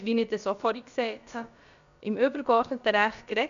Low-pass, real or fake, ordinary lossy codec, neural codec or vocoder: 7.2 kHz; fake; none; codec, 16 kHz, about 1 kbps, DyCAST, with the encoder's durations